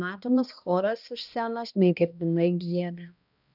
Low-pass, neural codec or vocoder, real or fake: 5.4 kHz; codec, 16 kHz, 1 kbps, X-Codec, HuBERT features, trained on balanced general audio; fake